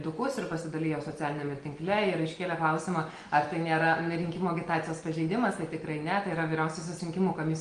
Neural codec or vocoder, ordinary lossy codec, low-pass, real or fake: none; Opus, 24 kbps; 9.9 kHz; real